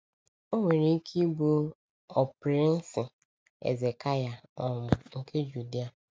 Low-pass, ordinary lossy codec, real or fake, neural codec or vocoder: none; none; real; none